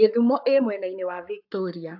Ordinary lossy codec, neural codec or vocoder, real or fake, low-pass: AAC, 48 kbps; codec, 44.1 kHz, 7.8 kbps, Pupu-Codec; fake; 5.4 kHz